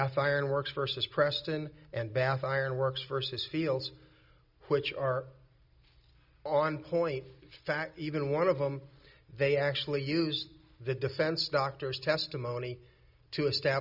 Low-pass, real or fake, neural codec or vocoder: 5.4 kHz; real; none